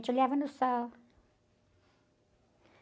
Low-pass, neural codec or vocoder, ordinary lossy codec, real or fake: none; none; none; real